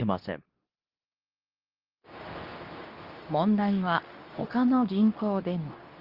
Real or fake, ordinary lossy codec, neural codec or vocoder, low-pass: fake; Opus, 32 kbps; codec, 24 kHz, 0.9 kbps, WavTokenizer, medium speech release version 2; 5.4 kHz